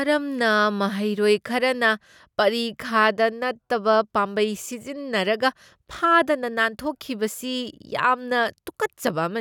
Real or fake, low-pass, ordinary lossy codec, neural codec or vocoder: real; 19.8 kHz; none; none